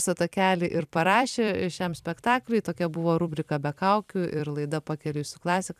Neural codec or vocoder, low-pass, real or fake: vocoder, 44.1 kHz, 128 mel bands every 256 samples, BigVGAN v2; 14.4 kHz; fake